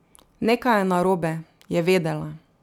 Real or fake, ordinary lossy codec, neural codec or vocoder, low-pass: real; none; none; 19.8 kHz